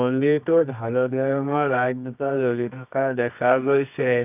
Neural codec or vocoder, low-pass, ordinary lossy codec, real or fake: codec, 24 kHz, 0.9 kbps, WavTokenizer, medium music audio release; 3.6 kHz; none; fake